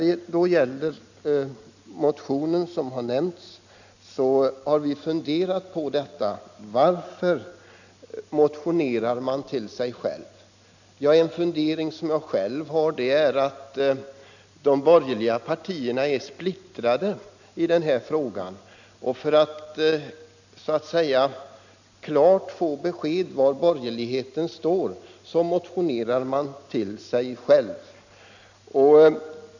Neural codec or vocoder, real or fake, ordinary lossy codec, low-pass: none; real; none; 7.2 kHz